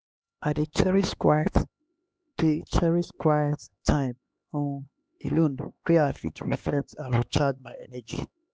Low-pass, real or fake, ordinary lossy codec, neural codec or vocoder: none; fake; none; codec, 16 kHz, 2 kbps, X-Codec, HuBERT features, trained on LibriSpeech